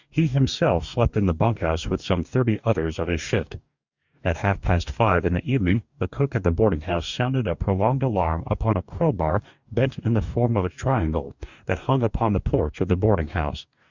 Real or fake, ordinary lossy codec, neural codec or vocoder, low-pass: fake; Opus, 64 kbps; codec, 44.1 kHz, 2.6 kbps, DAC; 7.2 kHz